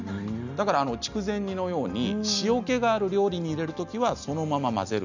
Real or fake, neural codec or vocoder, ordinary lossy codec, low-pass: real; none; none; 7.2 kHz